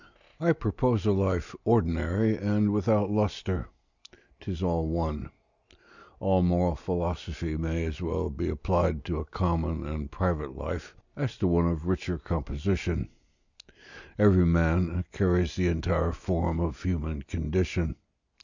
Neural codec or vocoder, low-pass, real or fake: none; 7.2 kHz; real